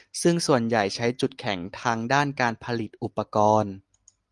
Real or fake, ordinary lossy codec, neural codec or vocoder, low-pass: real; Opus, 32 kbps; none; 10.8 kHz